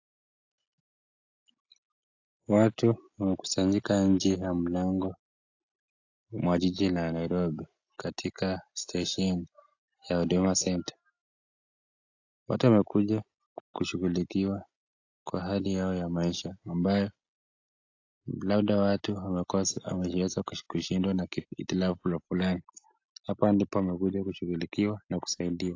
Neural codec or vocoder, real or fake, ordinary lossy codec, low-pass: none; real; AAC, 48 kbps; 7.2 kHz